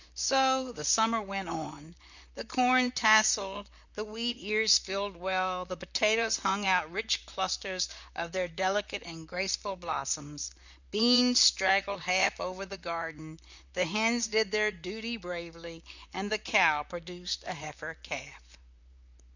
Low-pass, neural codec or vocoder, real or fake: 7.2 kHz; vocoder, 44.1 kHz, 128 mel bands, Pupu-Vocoder; fake